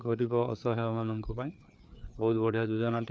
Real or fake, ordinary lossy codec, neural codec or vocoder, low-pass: fake; none; codec, 16 kHz, 4 kbps, FreqCodec, larger model; none